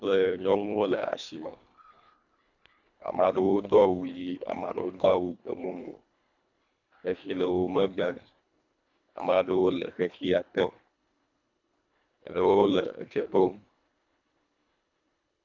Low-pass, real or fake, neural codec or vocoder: 7.2 kHz; fake; codec, 24 kHz, 1.5 kbps, HILCodec